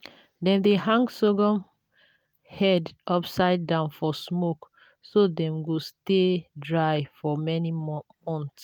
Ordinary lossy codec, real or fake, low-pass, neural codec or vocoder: none; real; none; none